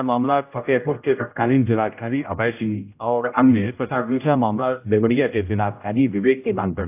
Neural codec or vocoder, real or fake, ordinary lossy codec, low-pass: codec, 16 kHz, 0.5 kbps, X-Codec, HuBERT features, trained on general audio; fake; AAC, 32 kbps; 3.6 kHz